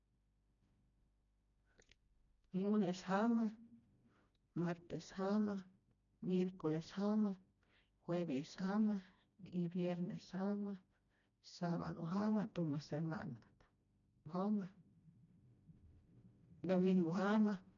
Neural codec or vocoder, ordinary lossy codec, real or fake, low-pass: codec, 16 kHz, 1 kbps, FreqCodec, smaller model; none; fake; 7.2 kHz